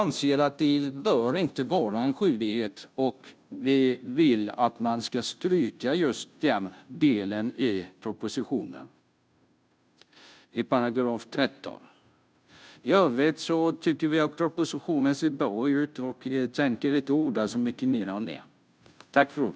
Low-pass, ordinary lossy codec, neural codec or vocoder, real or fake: none; none; codec, 16 kHz, 0.5 kbps, FunCodec, trained on Chinese and English, 25 frames a second; fake